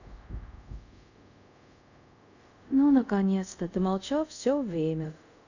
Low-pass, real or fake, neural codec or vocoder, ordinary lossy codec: 7.2 kHz; fake; codec, 24 kHz, 0.5 kbps, DualCodec; none